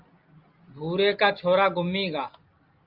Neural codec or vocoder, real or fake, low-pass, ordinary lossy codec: none; real; 5.4 kHz; Opus, 24 kbps